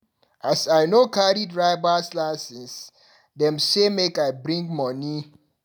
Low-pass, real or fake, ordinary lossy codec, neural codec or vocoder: none; real; none; none